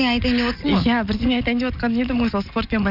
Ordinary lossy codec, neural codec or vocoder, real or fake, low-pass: none; none; real; 5.4 kHz